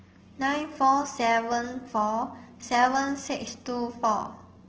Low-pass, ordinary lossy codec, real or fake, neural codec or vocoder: 7.2 kHz; Opus, 16 kbps; real; none